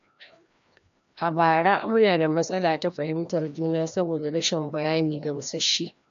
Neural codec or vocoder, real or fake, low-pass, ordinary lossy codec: codec, 16 kHz, 1 kbps, FreqCodec, larger model; fake; 7.2 kHz; MP3, 64 kbps